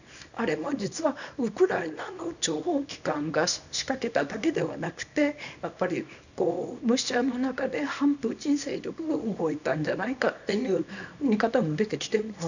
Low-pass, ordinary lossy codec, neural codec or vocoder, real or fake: 7.2 kHz; none; codec, 24 kHz, 0.9 kbps, WavTokenizer, small release; fake